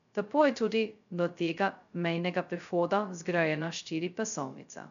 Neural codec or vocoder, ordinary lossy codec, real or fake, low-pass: codec, 16 kHz, 0.2 kbps, FocalCodec; none; fake; 7.2 kHz